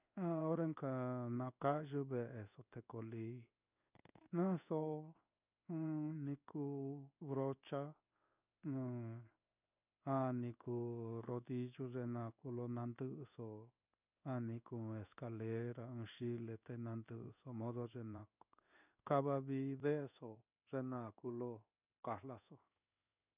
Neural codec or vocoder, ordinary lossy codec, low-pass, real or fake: codec, 16 kHz in and 24 kHz out, 1 kbps, XY-Tokenizer; none; 3.6 kHz; fake